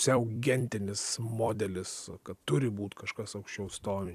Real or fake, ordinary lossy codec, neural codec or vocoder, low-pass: fake; AAC, 96 kbps; vocoder, 44.1 kHz, 128 mel bands every 256 samples, BigVGAN v2; 14.4 kHz